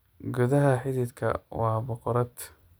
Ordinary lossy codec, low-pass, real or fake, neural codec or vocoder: none; none; fake; vocoder, 44.1 kHz, 128 mel bands every 256 samples, BigVGAN v2